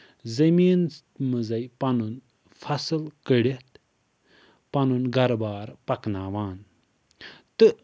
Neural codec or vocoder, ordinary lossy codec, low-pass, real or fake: none; none; none; real